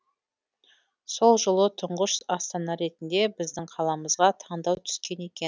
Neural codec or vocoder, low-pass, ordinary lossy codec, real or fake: none; none; none; real